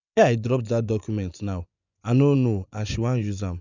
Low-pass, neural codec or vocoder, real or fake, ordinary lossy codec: 7.2 kHz; vocoder, 24 kHz, 100 mel bands, Vocos; fake; none